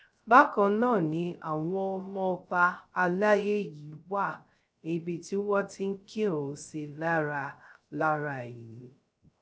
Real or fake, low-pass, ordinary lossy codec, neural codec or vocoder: fake; none; none; codec, 16 kHz, 0.3 kbps, FocalCodec